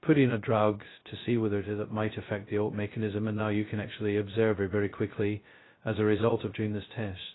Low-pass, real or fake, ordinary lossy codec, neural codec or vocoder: 7.2 kHz; fake; AAC, 16 kbps; codec, 16 kHz, 0.2 kbps, FocalCodec